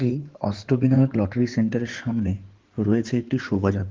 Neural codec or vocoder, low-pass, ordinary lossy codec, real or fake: codec, 16 kHz in and 24 kHz out, 2.2 kbps, FireRedTTS-2 codec; 7.2 kHz; Opus, 16 kbps; fake